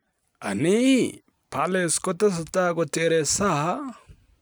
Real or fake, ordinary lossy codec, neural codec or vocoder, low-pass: real; none; none; none